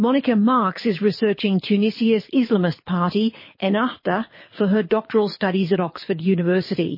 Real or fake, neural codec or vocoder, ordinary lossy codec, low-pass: fake; codec, 24 kHz, 6 kbps, HILCodec; MP3, 24 kbps; 5.4 kHz